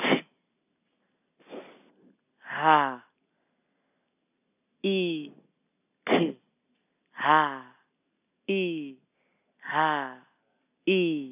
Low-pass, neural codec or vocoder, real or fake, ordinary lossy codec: 3.6 kHz; none; real; none